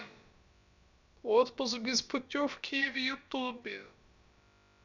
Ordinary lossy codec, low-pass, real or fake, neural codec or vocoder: none; 7.2 kHz; fake; codec, 16 kHz, about 1 kbps, DyCAST, with the encoder's durations